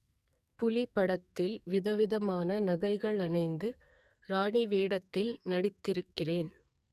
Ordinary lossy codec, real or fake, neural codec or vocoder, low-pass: none; fake; codec, 44.1 kHz, 2.6 kbps, SNAC; 14.4 kHz